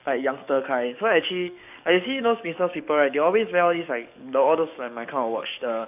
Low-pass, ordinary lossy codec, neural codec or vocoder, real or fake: 3.6 kHz; none; codec, 44.1 kHz, 7.8 kbps, DAC; fake